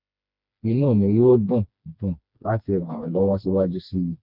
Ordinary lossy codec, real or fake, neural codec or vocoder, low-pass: none; fake; codec, 16 kHz, 2 kbps, FreqCodec, smaller model; 5.4 kHz